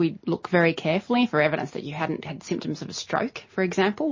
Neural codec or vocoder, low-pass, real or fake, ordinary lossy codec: none; 7.2 kHz; real; MP3, 32 kbps